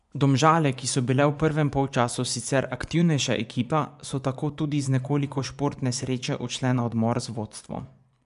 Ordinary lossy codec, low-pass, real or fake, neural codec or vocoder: none; 10.8 kHz; fake; vocoder, 24 kHz, 100 mel bands, Vocos